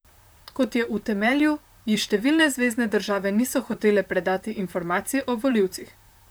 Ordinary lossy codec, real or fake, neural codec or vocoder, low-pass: none; fake; vocoder, 44.1 kHz, 128 mel bands, Pupu-Vocoder; none